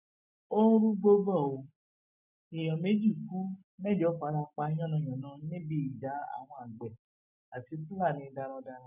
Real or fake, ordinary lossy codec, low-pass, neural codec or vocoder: real; none; 3.6 kHz; none